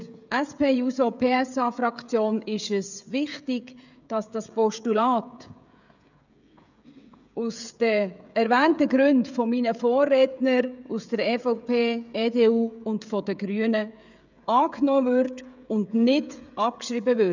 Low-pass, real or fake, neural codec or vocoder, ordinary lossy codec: 7.2 kHz; fake; codec, 16 kHz, 16 kbps, FreqCodec, smaller model; none